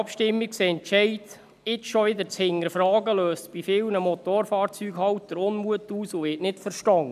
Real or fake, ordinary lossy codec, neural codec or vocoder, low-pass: real; none; none; 14.4 kHz